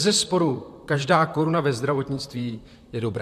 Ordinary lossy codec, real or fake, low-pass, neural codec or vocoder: MP3, 96 kbps; fake; 14.4 kHz; vocoder, 44.1 kHz, 128 mel bands, Pupu-Vocoder